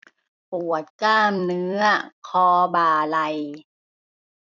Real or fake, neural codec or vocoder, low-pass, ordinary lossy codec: fake; vocoder, 44.1 kHz, 128 mel bands every 256 samples, BigVGAN v2; 7.2 kHz; none